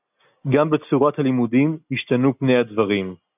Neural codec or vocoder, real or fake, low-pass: none; real; 3.6 kHz